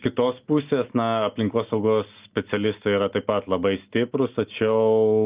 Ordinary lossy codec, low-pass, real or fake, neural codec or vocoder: Opus, 32 kbps; 3.6 kHz; real; none